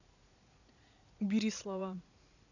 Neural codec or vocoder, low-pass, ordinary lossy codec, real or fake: vocoder, 44.1 kHz, 80 mel bands, Vocos; 7.2 kHz; none; fake